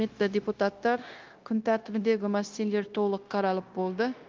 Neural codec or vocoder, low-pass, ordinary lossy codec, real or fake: codec, 16 kHz, 0.9 kbps, LongCat-Audio-Codec; 7.2 kHz; Opus, 32 kbps; fake